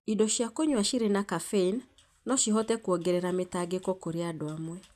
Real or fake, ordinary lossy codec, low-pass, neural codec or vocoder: real; none; 14.4 kHz; none